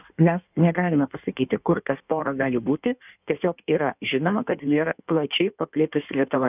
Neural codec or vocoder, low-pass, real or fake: codec, 16 kHz in and 24 kHz out, 1.1 kbps, FireRedTTS-2 codec; 3.6 kHz; fake